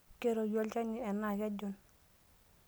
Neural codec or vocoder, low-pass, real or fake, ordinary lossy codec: none; none; real; none